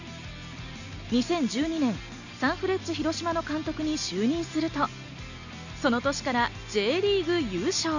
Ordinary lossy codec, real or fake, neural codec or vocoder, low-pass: none; real; none; 7.2 kHz